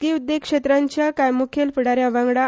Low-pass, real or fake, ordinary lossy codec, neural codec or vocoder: none; real; none; none